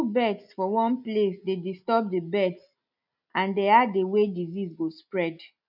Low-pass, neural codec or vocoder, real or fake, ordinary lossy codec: 5.4 kHz; none; real; none